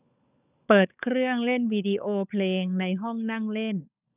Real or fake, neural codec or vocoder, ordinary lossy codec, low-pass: fake; codec, 16 kHz, 16 kbps, FunCodec, trained on LibriTTS, 50 frames a second; none; 3.6 kHz